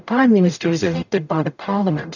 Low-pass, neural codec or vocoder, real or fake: 7.2 kHz; codec, 44.1 kHz, 0.9 kbps, DAC; fake